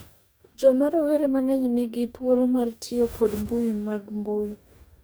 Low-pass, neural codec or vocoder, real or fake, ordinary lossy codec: none; codec, 44.1 kHz, 2.6 kbps, DAC; fake; none